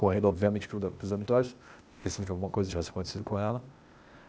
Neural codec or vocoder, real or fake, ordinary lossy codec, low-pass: codec, 16 kHz, 0.8 kbps, ZipCodec; fake; none; none